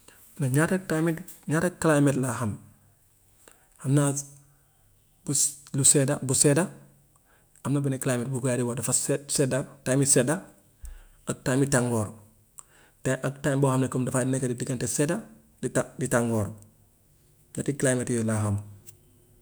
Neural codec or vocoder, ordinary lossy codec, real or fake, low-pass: autoencoder, 48 kHz, 128 numbers a frame, DAC-VAE, trained on Japanese speech; none; fake; none